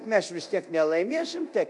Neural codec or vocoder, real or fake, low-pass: codec, 24 kHz, 0.9 kbps, DualCodec; fake; 10.8 kHz